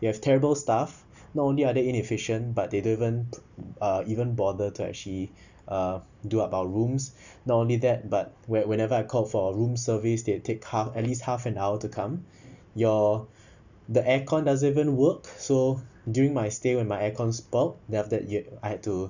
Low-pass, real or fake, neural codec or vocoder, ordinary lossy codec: 7.2 kHz; real; none; none